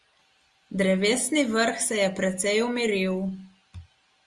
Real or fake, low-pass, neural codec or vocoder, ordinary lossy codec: real; 10.8 kHz; none; Opus, 32 kbps